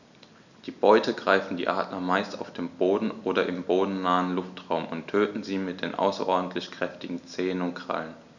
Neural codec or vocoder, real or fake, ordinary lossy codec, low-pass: none; real; none; 7.2 kHz